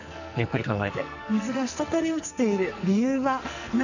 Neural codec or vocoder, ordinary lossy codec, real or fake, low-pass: codec, 44.1 kHz, 2.6 kbps, SNAC; none; fake; 7.2 kHz